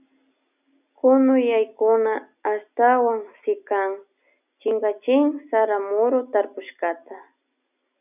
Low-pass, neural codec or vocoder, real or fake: 3.6 kHz; none; real